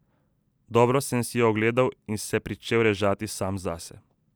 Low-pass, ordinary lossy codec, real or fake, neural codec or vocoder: none; none; real; none